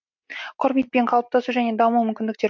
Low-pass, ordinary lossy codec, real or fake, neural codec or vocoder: 7.2 kHz; MP3, 48 kbps; real; none